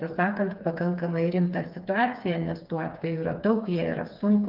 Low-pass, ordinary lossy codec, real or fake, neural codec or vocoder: 5.4 kHz; Opus, 32 kbps; fake; codec, 16 kHz, 4 kbps, FreqCodec, smaller model